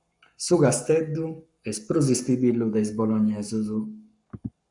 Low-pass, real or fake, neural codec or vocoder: 10.8 kHz; fake; codec, 44.1 kHz, 7.8 kbps, Pupu-Codec